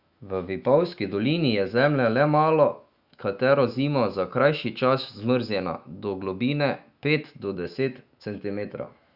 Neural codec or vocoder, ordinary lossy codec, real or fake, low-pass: autoencoder, 48 kHz, 128 numbers a frame, DAC-VAE, trained on Japanese speech; Opus, 64 kbps; fake; 5.4 kHz